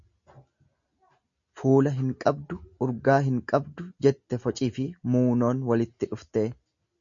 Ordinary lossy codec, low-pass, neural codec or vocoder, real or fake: MP3, 64 kbps; 7.2 kHz; none; real